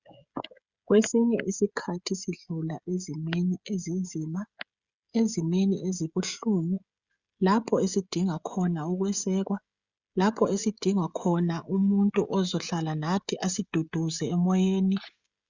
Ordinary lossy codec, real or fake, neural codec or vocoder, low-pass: Opus, 64 kbps; fake; codec, 16 kHz, 16 kbps, FreqCodec, smaller model; 7.2 kHz